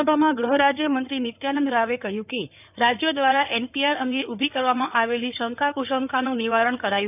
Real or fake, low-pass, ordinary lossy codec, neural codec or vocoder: fake; 3.6 kHz; none; codec, 16 kHz in and 24 kHz out, 2.2 kbps, FireRedTTS-2 codec